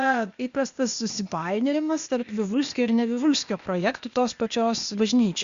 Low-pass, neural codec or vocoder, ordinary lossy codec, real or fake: 7.2 kHz; codec, 16 kHz, 0.8 kbps, ZipCodec; Opus, 64 kbps; fake